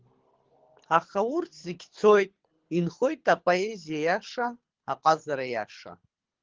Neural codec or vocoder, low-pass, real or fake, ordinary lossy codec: codec, 24 kHz, 6 kbps, HILCodec; 7.2 kHz; fake; Opus, 16 kbps